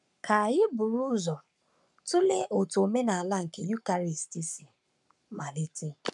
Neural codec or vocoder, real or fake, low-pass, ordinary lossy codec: codec, 44.1 kHz, 7.8 kbps, Pupu-Codec; fake; 10.8 kHz; none